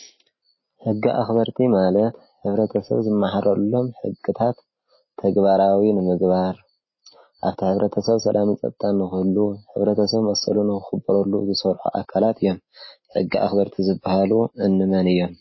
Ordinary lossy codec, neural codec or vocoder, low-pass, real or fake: MP3, 24 kbps; none; 7.2 kHz; real